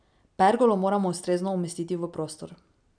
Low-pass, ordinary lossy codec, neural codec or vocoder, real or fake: 9.9 kHz; none; none; real